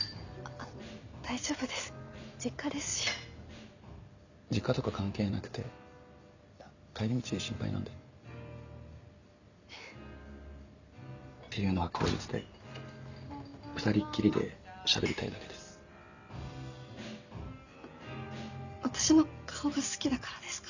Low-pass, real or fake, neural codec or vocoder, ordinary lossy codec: 7.2 kHz; real; none; none